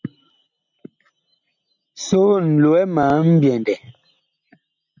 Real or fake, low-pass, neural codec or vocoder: real; 7.2 kHz; none